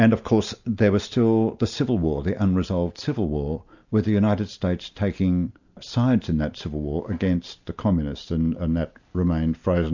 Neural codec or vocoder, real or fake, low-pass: none; real; 7.2 kHz